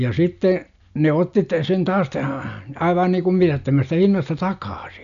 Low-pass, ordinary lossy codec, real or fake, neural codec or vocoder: 7.2 kHz; none; real; none